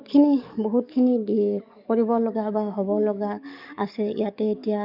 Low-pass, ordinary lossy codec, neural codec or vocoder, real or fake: 5.4 kHz; none; codec, 44.1 kHz, 7.8 kbps, DAC; fake